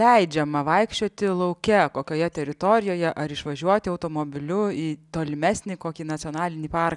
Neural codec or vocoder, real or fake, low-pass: none; real; 10.8 kHz